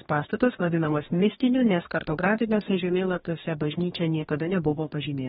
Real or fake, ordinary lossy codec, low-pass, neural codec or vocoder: fake; AAC, 16 kbps; 19.8 kHz; codec, 44.1 kHz, 2.6 kbps, DAC